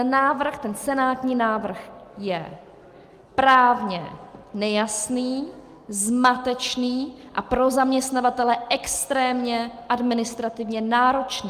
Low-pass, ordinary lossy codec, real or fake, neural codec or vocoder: 14.4 kHz; Opus, 32 kbps; real; none